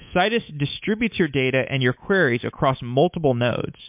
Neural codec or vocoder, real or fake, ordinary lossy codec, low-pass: codec, 24 kHz, 3.1 kbps, DualCodec; fake; MP3, 32 kbps; 3.6 kHz